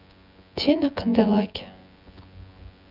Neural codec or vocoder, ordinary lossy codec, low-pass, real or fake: vocoder, 24 kHz, 100 mel bands, Vocos; none; 5.4 kHz; fake